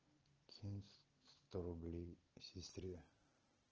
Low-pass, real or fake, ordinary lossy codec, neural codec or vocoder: 7.2 kHz; real; Opus, 16 kbps; none